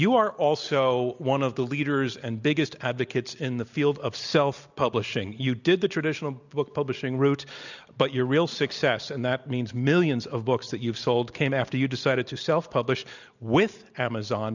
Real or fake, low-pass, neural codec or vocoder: real; 7.2 kHz; none